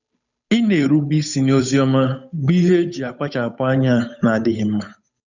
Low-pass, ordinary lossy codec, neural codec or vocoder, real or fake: 7.2 kHz; none; codec, 16 kHz, 8 kbps, FunCodec, trained on Chinese and English, 25 frames a second; fake